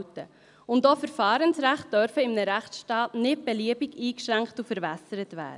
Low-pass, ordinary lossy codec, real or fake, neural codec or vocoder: 10.8 kHz; none; real; none